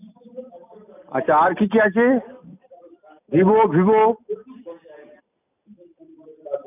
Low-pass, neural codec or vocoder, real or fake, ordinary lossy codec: 3.6 kHz; none; real; none